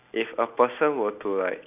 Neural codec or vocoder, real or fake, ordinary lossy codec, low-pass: none; real; none; 3.6 kHz